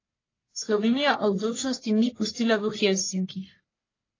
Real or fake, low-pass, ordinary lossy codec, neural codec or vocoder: fake; 7.2 kHz; AAC, 32 kbps; codec, 44.1 kHz, 1.7 kbps, Pupu-Codec